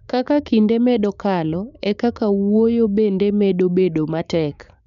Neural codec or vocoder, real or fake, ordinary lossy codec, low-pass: codec, 16 kHz, 6 kbps, DAC; fake; none; 7.2 kHz